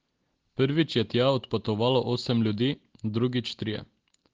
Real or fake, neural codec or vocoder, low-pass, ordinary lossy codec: real; none; 7.2 kHz; Opus, 16 kbps